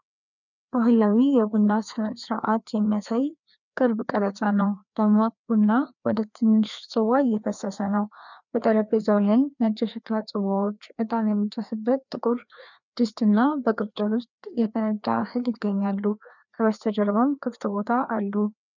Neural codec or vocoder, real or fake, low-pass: codec, 16 kHz, 2 kbps, FreqCodec, larger model; fake; 7.2 kHz